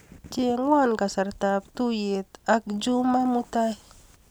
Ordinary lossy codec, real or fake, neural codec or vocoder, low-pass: none; real; none; none